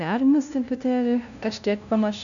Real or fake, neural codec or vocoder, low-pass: fake; codec, 16 kHz, 0.5 kbps, FunCodec, trained on LibriTTS, 25 frames a second; 7.2 kHz